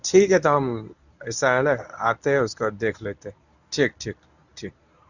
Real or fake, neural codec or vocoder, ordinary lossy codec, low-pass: fake; codec, 24 kHz, 0.9 kbps, WavTokenizer, medium speech release version 1; none; 7.2 kHz